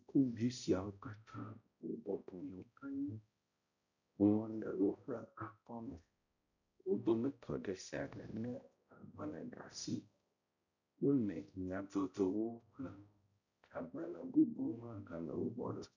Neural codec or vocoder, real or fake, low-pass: codec, 16 kHz, 0.5 kbps, X-Codec, HuBERT features, trained on balanced general audio; fake; 7.2 kHz